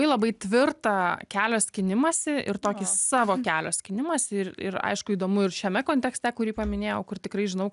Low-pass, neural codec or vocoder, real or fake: 10.8 kHz; none; real